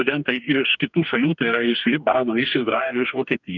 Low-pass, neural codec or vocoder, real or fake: 7.2 kHz; codec, 44.1 kHz, 2.6 kbps, DAC; fake